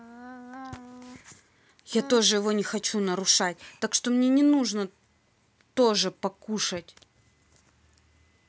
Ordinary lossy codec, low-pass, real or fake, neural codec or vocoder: none; none; real; none